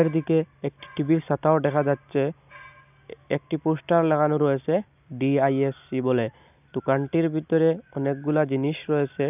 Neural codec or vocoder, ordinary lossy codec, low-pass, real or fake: none; none; 3.6 kHz; real